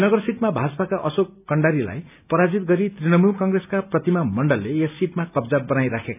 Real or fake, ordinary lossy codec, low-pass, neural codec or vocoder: real; none; 3.6 kHz; none